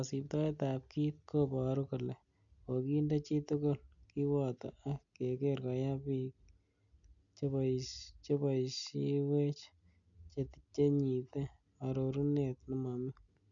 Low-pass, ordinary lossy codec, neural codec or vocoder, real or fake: 7.2 kHz; none; none; real